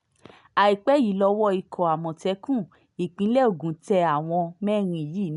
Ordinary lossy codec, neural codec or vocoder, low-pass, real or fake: none; none; 10.8 kHz; real